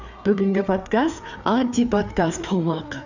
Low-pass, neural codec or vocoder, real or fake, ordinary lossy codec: 7.2 kHz; codec, 16 kHz, 4 kbps, FreqCodec, larger model; fake; none